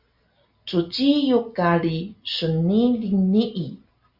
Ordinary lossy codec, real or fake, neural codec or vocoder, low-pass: Opus, 64 kbps; real; none; 5.4 kHz